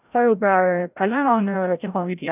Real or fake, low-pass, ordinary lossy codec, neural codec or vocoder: fake; 3.6 kHz; none; codec, 16 kHz, 0.5 kbps, FreqCodec, larger model